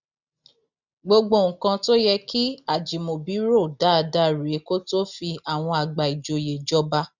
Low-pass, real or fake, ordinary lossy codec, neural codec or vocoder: 7.2 kHz; real; none; none